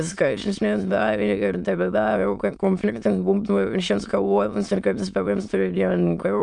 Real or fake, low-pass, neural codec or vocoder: fake; 9.9 kHz; autoencoder, 22.05 kHz, a latent of 192 numbers a frame, VITS, trained on many speakers